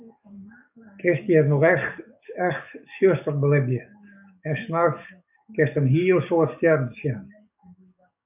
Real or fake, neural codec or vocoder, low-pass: fake; codec, 44.1 kHz, 7.8 kbps, DAC; 3.6 kHz